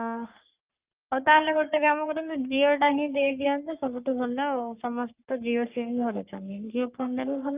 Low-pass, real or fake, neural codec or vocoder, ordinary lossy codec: 3.6 kHz; fake; codec, 44.1 kHz, 3.4 kbps, Pupu-Codec; Opus, 24 kbps